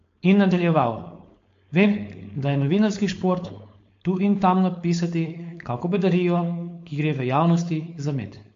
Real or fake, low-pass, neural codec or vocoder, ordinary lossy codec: fake; 7.2 kHz; codec, 16 kHz, 4.8 kbps, FACodec; MP3, 48 kbps